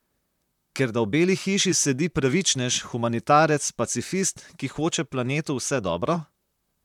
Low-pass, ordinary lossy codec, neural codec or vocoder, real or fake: 19.8 kHz; none; vocoder, 44.1 kHz, 128 mel bands, Pupu-Vocoder; fake